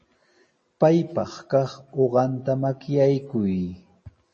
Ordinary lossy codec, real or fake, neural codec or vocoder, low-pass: MP3, 32 kbps; real; none; 10.8 kHz